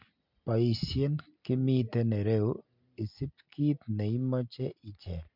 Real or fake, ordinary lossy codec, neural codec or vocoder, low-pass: real; MP3, 48 kbps; none; 5.4 kHz